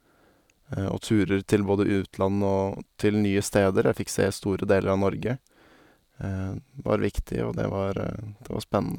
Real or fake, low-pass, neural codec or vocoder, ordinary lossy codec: real; 19.8 kHz; none; none